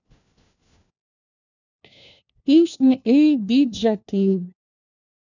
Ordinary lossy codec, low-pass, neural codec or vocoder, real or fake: none; 7.2 kHz; codec, 16 kHz, 1 kbps, FunCodec, trained on LibriTTS, 50 frames a second; fake